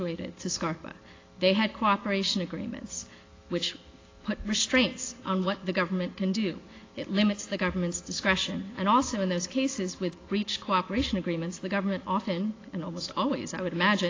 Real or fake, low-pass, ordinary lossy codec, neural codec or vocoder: real; 7.2 kHz; AAC, 32 kbps; none